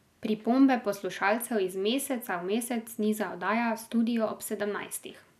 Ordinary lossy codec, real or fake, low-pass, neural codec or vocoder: none; real; 14.4 kHz; none